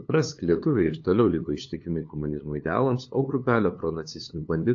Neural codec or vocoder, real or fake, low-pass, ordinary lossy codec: codec, 16 kHz, 2 kbps, FunCodec, trained on LibriTTS, 25 frames a second; fake; 7.2 kHz; AAC, 48 kbps